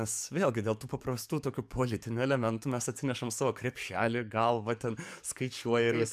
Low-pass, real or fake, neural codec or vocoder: 14.4 kHz; fake; codec, 44.1 kHz, 7.8 kbps, DAC